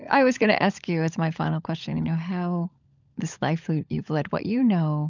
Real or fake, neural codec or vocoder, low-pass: fake; codec, 16 kHz, 16 kbps, FunCodec, trained on LibriTTS, 50 frames a second; 7.2 kHz